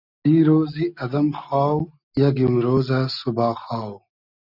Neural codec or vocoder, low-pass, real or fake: none; 5.4 kHz; real